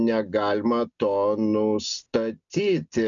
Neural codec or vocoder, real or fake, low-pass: none; real; 7.2 kHz